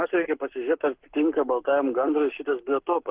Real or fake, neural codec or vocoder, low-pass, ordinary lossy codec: fake; codec, 44.1 kHz, 7.8 kbps, Pupu-Codec; 3.6 kHz; Opus, 16 kbps